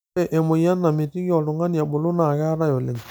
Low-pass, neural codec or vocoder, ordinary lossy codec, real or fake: none; none; none; real